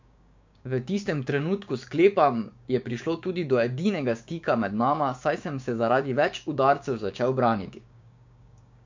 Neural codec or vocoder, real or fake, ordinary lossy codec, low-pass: codec, 16 kHz, 6 kbps, DAC; fake; MP3, 64 kbps; 7.2 kHz